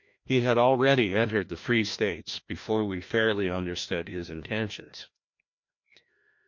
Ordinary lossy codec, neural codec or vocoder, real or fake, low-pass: MP3, 48 kbps; codec, 16 kHz, 1 kbps, FreqCodec, larger model; fake; 7.2 kHz